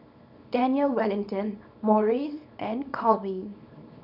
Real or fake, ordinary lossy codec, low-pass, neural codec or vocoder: fake; none; 5.4 kHz; codec, 24 kHz, 0.9 kbps, WavTokenizer, small release